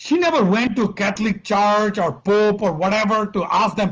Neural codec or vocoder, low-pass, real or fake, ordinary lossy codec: none; 7.2 kHz; real; Opus, 24 kbps